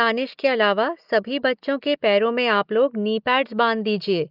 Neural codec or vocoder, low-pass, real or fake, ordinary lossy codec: none; 5.4 kHz; real; Opus, 32 kbps